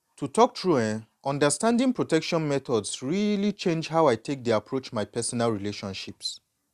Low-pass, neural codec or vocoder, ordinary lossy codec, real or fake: 14.4 kHz; none; Opus, 64 kbps; real